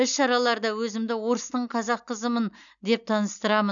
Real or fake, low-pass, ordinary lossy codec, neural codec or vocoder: real; 7.2 kHz; none; none